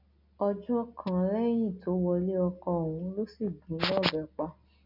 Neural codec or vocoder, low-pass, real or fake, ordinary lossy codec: none; 5.4 kHz; real; none